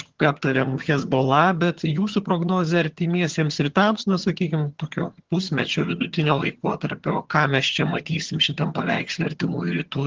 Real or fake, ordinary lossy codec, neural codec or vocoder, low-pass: fake; Opus, 16 kbps; vocoder, 22.05 kHz, 80 mel bands, HiFi-GAN; 7.2 kHz